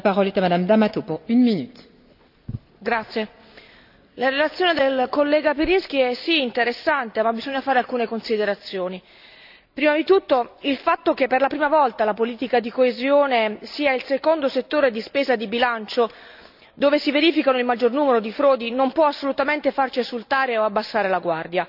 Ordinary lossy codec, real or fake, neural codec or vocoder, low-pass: none; real; none; 5.4 kHz